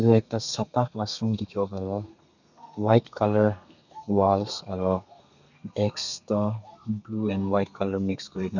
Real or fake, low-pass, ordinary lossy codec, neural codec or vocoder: fake; 7.2 kHz; none; codec, 32 kHz, 1.9 kbps, SNAC